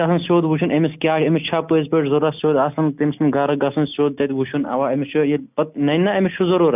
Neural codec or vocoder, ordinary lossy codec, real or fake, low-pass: none; none; real; 3.6 kHz